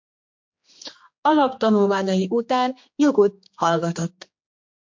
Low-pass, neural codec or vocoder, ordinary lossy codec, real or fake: 7.2 kHz; codec, 16 kHz, 2 kbps, X-Codec, HuBERT features, trained on general audio; MP3, 48 kbps; fake